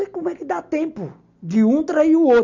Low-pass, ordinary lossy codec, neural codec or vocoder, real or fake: 7.2 kHz; none; none; real